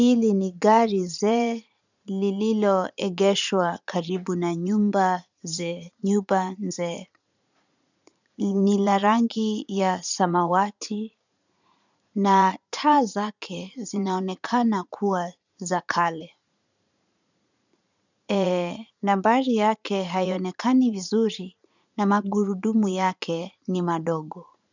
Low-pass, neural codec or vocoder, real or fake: 7.2 kHz; vocoder, 44.1 kHz, 80 mel bands, Vocos; fake